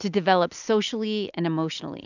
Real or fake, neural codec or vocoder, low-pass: real; none; 7.2 kHz